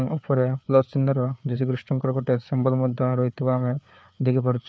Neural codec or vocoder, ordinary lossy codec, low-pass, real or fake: codec, 16 kHz, 4 kbps, FreqCodec, larger model; none; none; fake